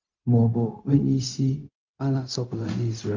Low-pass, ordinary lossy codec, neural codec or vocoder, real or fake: 7.2 kHz; Opus, 24 kbps; codec, 16 kHz, 0.4 kbps, LongCat-Audio-Codec; fake